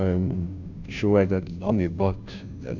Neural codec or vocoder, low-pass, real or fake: codec, 16 kHz, 0.5 kbps, FunCodec, trained on Chinese and English, 25 frames a second; 7.2 kHz; fake